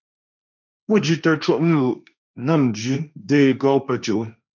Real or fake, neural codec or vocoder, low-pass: fake; codec, 16 kHz, 1.1 kbps, Voila-Tokenizer; 7.2 kHz